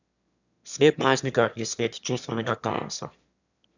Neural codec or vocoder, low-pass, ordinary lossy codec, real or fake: autoencoder, 22.05 kHz, a latent of 192 numbers a frame, VITS, trained on one speaker; 7.2 kHz; none; fake